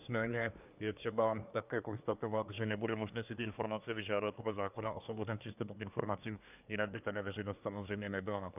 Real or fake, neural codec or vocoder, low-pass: fake; codec, 24 kHz, 1 kbps, SNAC; 3.6 kHz